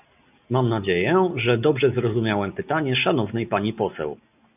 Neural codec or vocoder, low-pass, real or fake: none; 3.6 kHz; real